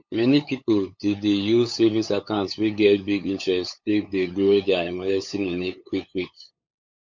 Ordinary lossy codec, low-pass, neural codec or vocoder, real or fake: MP3, 48 kbps; 7.2 kHz; codec, 16 kHz, 8 kbps, FunCodec, trained on LibriTTS, 25 frames a second; fake